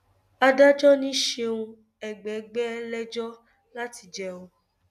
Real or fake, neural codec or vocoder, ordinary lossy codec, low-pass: real; none; none; 14.4 kHz